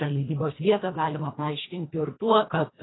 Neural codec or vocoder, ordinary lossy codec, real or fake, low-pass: codec, 24 kHz, 1.5 kbps, HILCodec; AAC, 16 kbps; fake; 7.2 kHz